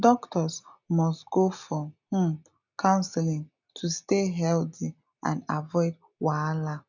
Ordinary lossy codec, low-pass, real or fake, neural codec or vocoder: none; 7.2 kHz; real; none